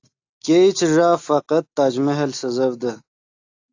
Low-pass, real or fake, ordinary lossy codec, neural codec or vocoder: 7.2 kHz; real; AAC, 48 kbps; none